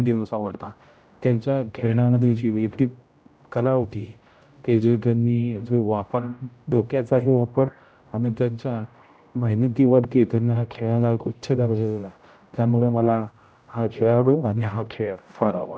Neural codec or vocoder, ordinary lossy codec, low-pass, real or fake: codec, 16 kHz, 0.5 kbps, X-Codec, HuBERT features, trained on general audio; none; none; fake